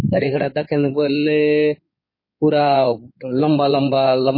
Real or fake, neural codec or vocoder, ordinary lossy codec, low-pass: fake; codec, 16 kHz in and 24 kHz out, 2.2 kbps, FireRedTTS-2 codec; MP3, 24 kbps; 5.4 kHz